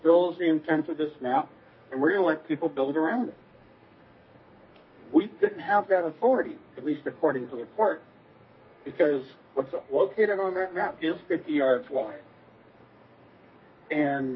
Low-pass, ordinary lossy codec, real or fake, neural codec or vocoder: 7.2 kHz; MP3, 24 kbps; fake; codec, 32 kHz, 1.9 kbps, SNAC